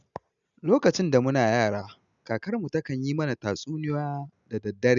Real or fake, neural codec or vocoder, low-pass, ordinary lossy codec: real; none; 7.2 kHz; none